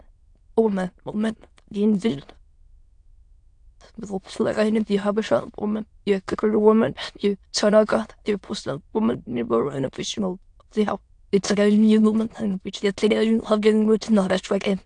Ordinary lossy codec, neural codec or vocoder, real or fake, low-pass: AAC, 64 kbps; autoencoder, 22.05 kHz, a latent of 192 numbers a frame, VITS, trained on many speakers; fake; 9.9 kHz